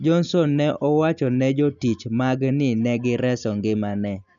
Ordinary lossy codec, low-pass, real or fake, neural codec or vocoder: none; 7.2 kHz; real; none